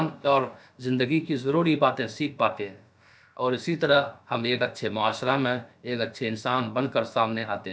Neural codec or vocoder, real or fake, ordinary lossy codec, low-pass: codec, 16 kHz, about 1 kbps, DyCAST, with the encoder's durations; fake; none; none